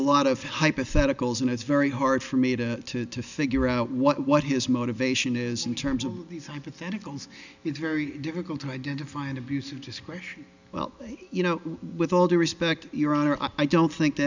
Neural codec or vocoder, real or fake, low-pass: none; real; 7.2 kHz